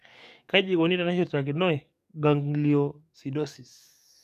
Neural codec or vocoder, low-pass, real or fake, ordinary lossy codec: codec, 44.1 kHz, 7.8 kbps, DAC; 14.4 kHz; fake; none